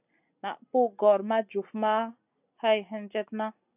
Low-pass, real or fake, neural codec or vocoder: 3.6 kHz; real; none